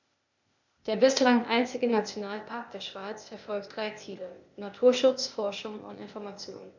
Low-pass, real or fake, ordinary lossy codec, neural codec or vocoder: 7.2 kHz; fake; none; codec, 16 kHz, 0.8 kbps, ZipCodec